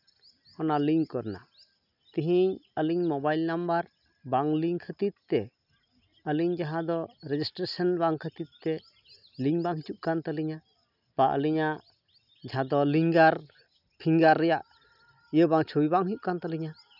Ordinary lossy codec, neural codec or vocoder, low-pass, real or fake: none; none; 5.4 kHz; real